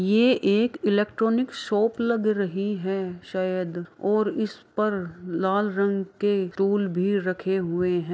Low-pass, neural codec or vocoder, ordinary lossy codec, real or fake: none; none; none; real